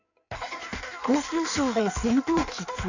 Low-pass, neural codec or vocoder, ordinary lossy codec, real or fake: 7.2 kHz; codec, 16 kHz in and 24 kHz out, 1.1 kbps, FireRedTTS-2 codec; none; fake